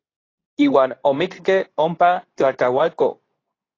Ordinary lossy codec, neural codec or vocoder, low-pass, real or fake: AAC, 32 kbps; codec, 24 kHz, 0.9 kbps, WavTokenizer, medium speech release version 2; 7.2 kHz; fake